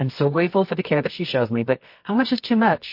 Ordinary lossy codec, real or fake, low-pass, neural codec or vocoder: MP3, 32 kbps; fake; 5.4 kHz; codec, 24 kHz, 0.9 kbps, WavTokenizer, medium music audio release